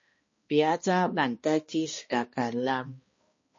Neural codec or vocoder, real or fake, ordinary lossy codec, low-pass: codec, 16 kHz, 1 kbps, X-Codec, HuBERT features, trained on balanced general audio; fake; MP3, 32 kbps; 7.2 kHz